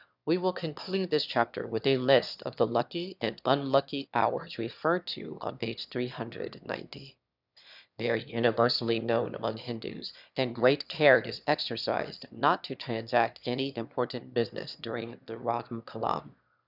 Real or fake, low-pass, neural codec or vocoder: fake; 5.4 kHz; autoencoder, 22.05 kHz, a latent of 192 numbers a frame, VITS, trained on one speaker